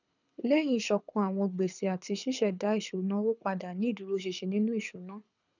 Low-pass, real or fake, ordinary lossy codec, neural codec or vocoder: 7.2 kHz; fake; none; codec, 24 kHz, 6 kbps, HILCodec